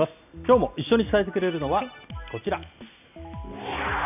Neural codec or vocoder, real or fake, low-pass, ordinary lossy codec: none; real; 3.6 kHz; none